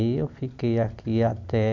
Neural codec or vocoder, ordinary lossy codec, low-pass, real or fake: none; none; 7.2 kHz; real